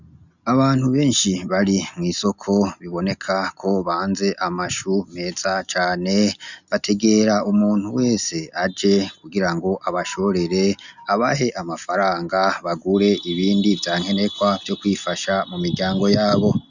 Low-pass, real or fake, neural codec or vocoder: 7.2 kHz; real; none